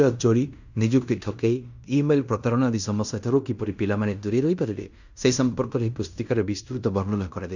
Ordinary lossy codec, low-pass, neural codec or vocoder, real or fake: none; 7.2 kHz; codec, 16 kHz in and 24 kHz out, 0.9 kbps, LongCat-Audio-Codec, fine tuned four codebook decoder; fake